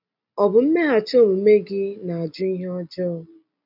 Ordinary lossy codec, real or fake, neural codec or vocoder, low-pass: none; real; none; 5.4 kHz